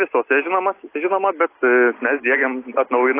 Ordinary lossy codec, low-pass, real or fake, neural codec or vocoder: AAC, 24 kbps; 3.6 kHz; real; none